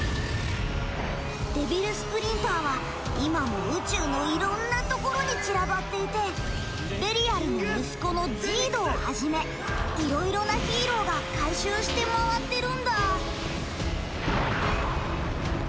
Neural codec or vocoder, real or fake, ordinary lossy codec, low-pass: none; real; none; none